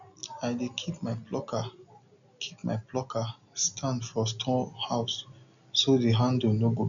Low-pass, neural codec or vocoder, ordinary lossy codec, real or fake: 7.2 kHz; none; none; real